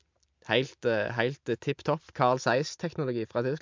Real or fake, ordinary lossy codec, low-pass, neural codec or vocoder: real; MP3, 64 kbps; 7.2 kHz; none